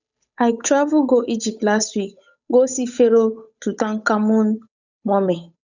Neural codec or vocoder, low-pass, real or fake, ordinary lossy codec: codec, 16 kHz, 8 kbps, FunCodec, trained on Chinese and English, 25 frames a second; 7.2 kHz; fake; none